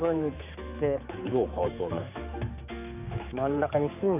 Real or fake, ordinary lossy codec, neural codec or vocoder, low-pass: fake; AAC, 32 kbps; codec, 16 kHz, 4 kbps, X-Codec, HuBERT features, trained on general audio; 3.6 kHz